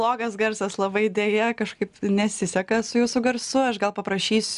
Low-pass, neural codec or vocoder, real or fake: 10.8 kHz; none; real